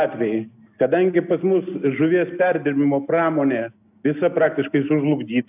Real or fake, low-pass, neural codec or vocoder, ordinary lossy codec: real; 3.6 kHz; none; AAC, 32 kbps